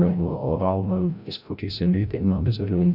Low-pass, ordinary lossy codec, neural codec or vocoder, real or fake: 5.4 kHz; none; codec, 16 kHz, 0.5 kbps, FreqCodec, larger model; fake